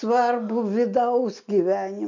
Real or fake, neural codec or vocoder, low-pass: real; none; 7.2 kHz